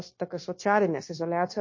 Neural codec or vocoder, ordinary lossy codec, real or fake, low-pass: codec, 16 kHz, 0.9 kbps, LongCat-Audio-Codec; MP3, 32 kbps; fake; 7.2 kHz